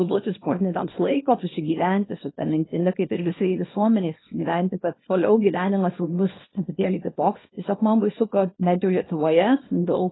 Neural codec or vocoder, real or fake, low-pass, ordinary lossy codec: codec, 24 kHz, 0.9 kbps, WavTokenizer, small release; fake; 7.2 kHz; AAC, 16 kbps